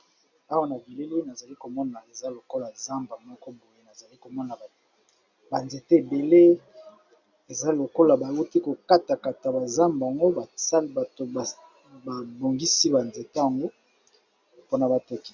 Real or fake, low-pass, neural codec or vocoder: real; 7.2 kHz; none